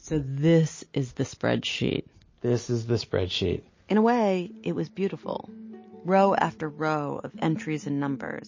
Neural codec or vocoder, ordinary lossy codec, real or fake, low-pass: none; MP3, 32 kbps; real; 7.2 kHz